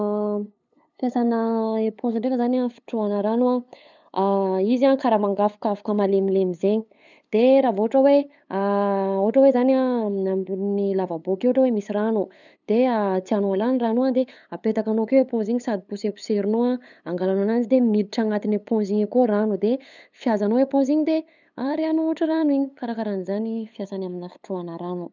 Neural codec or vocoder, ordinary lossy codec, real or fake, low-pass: codec, 16 kHz, 8 kbps, FunCodec, trained on LibriTTS, 25 frames a second; none; fake; 7.2 kHz